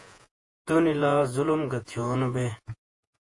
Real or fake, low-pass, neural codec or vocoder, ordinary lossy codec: fake; 10.8 kHz; vocoder, 48 kHz, 128 mel bands, Vocos; AAC, 48 kbps